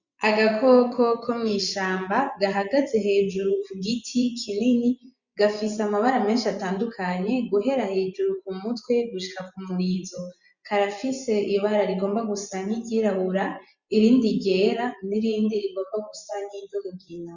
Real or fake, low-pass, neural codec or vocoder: fake; 7.2 kHz; vocoder, 24 kHz, 100 mel bands, Vocos